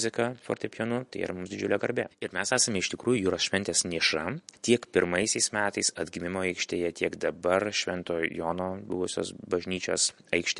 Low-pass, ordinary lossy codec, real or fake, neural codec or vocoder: 14.4 kHz; MP3, 48 kbps; real; none